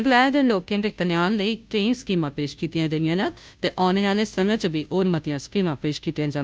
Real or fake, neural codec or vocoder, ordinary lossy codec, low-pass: fake; codec, 16 kHz, 0.5 kbps, FunCodec, trained on Chinese and English, 25 frames a second; none; none